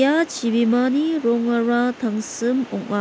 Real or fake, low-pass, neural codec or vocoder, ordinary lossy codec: real; none; none; none